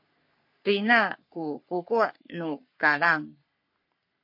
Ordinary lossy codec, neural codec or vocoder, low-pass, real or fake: MP3, 32 kbps; codec, 44.1 kHz, 3.4 kbps, Pupu-Codec; 5.4 kHz; fake